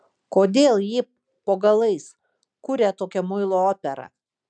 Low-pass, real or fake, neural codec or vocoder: 9.9 kHz; real; none